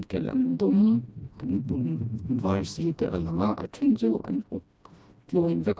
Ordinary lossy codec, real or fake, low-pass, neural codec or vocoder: none; fake; none; codec, 16 kHz, 1 kbps, FreqCodec, smaller model